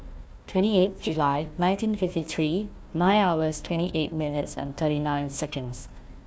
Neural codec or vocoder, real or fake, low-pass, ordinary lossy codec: codec, 16 kHz, 1 kbps, FunCodec, trained on Chinese and English, 50 frames a second; fake; none; none